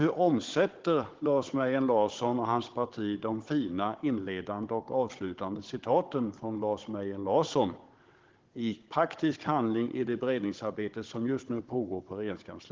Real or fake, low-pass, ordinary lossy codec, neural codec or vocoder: fake; 7.2 kHz; Opus, 16 kbps; codec, 16 kHz, 16 kbps, FunCodec, trained on Chinese and English, 50 frames a second